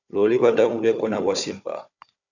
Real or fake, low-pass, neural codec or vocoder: fake; 7.2 kHz; codec, 16 kHz, 4 kbps, FunCodec, trained on Chinese and English, 50 frames a second